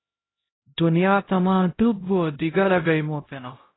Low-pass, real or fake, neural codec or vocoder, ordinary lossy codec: 7.2 kHz; fake; codec, 16 kHz, 0.5 kbps, X-Codec, HuBERT features, trained on LibriSpeech; AAC, 16 kbps